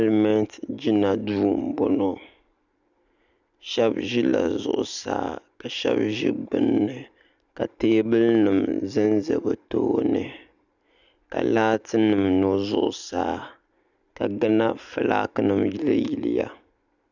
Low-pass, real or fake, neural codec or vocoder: 7.2 kHz; real; none